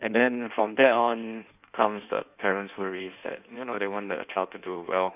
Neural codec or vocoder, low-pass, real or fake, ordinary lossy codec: codec, 16 kHz in and 24 kHz out, 1.1 kbps, FireRedTTS-2 codec; 3.6 kHz; fake; none